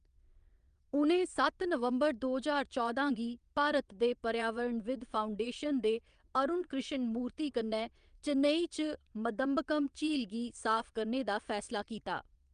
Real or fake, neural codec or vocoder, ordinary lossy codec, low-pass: fake; vocoder, 22.05 kHz, 80 mel bands, WaveNeXt; Opus, 32 kbps; 9.9 kHz